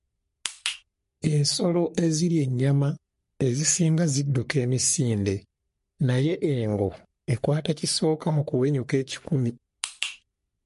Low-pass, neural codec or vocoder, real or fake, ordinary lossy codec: 14.4 kHz; codec, 44.1 kHz, 3.4 kbps, Pupu-Codec; fake; MP3, 48 kbps